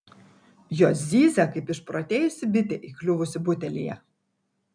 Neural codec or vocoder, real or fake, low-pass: none; real; 9.9 kHz